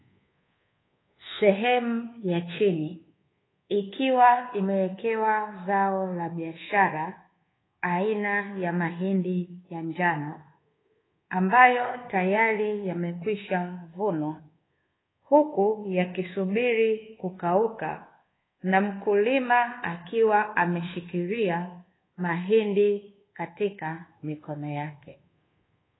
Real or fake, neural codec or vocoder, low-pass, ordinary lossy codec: fake; codec, 24 kHz, 1.2 kbps, DualCodec; 7.2 kHz; AAC, 16 kbps